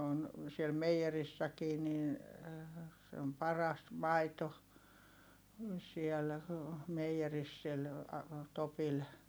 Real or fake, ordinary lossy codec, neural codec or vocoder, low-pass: real; none; none; none